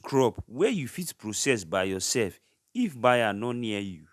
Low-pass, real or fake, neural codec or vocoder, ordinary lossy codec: 14.4 kHz; real; none; none